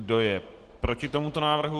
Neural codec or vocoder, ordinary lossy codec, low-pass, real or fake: none; Opus, 16 kbps; 10.8 kHz; real